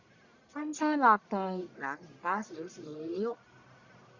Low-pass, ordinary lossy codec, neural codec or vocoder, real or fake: 7.2 kHz; Opus, 64 kbps; codec, 44.1 kHz, 1.7 kbps, Pupu-Codec; fake